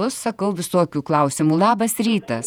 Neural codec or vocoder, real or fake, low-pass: vocoder, 48 kHz, 128 mel bands, Vocos; fake; 19.8 kHz